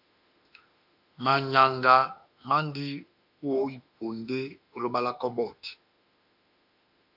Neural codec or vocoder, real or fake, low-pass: autoencoder, 48 kHz, 32 numbers a frame, DAC-VAE, trained on Japanese speech; fake; 5.4 kHz